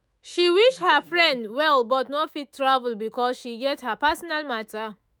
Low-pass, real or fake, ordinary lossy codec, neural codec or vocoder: none; fake; none; autoencoder, 48 kHz, 128 numbers a frame, DAC-VAE, trained on Japanese speech